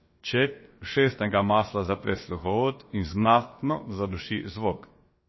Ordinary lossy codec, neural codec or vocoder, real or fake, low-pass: MP3, 24 kbps; codec, 16 kHz, about 1 kbps, DyCAST, with the encoder's durations; fake; 7.2 kHz